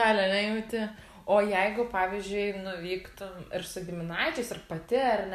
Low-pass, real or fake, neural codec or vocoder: 14.4 kHz; real; none